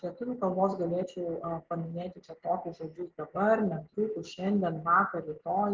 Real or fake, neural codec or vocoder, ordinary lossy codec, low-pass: real; none; Opus, 16 kbps; 7.2 kHz